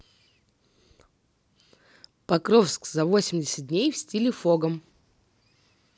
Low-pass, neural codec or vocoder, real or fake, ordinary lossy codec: none; none; real; none